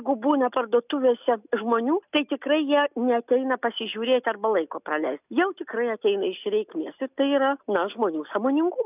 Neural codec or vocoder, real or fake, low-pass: none; real; 3.6 kHz